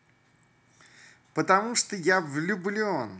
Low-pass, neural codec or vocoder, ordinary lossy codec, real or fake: none; none; none; real